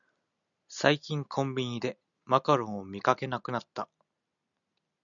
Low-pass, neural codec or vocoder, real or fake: 7.2 kHz; none; real